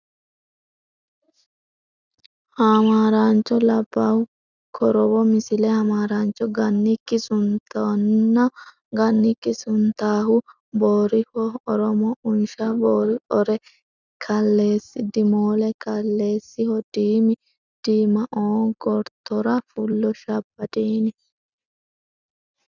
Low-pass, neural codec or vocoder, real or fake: 7.2 kHz; none; real